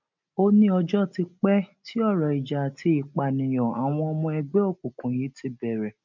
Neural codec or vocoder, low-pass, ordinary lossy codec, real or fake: none; 7.2 kHz; none; real